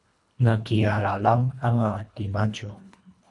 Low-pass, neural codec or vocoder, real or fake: 10.8 kHz; codec, 24 kHz, 1.5 kbps, HILCodec; fake